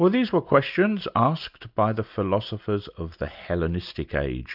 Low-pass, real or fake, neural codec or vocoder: 5.4 kHz; real; none